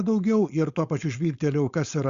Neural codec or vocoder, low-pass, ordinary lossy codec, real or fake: codec, 16 kHz, 4.8 kbps, FACodec; 7.2 kHz; Opus, 64 kbps; fake